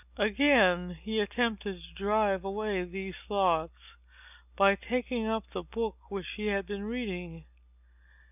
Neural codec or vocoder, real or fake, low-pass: none; real; 3.6 kHz